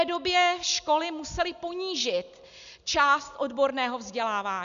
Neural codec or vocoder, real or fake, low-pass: none; real; 7.2 kHz